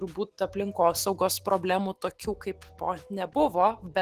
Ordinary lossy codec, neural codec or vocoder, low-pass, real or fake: Opus, 16 kbps; autoencoder, 48 kHz, 128 numbers a frame, DAC-VAE, trained on Japanese speech; 14.4 kHz; fake